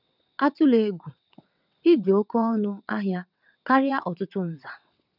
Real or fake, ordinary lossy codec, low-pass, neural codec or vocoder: fake; none; 5.4 kHz; vocoder, 22.05 kHz, 80 mel bands, WaveNeXt